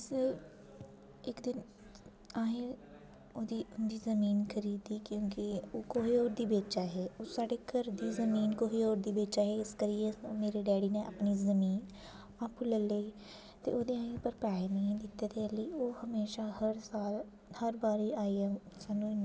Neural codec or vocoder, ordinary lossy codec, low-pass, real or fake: none; none; none; real